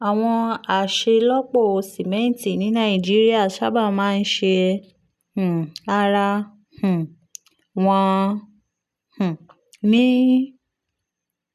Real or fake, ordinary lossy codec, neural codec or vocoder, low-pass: real; none; none; 14.4 kHz